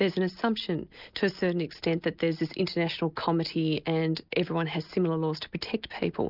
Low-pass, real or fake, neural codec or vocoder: 5.4 kHz; real; none